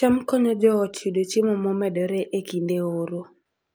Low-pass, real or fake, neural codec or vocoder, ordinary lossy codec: none; real; none; none